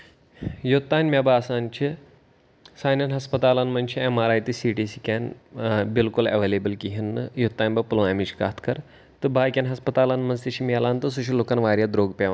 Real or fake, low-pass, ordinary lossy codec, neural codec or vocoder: real; none; none; none